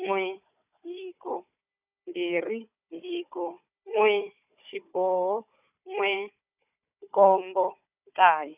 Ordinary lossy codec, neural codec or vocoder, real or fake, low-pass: none; codec, 16 kHz, 4 kbps, FunCodec, trained on Chinese and English, 50 frames a second; fake; 3.6 kHz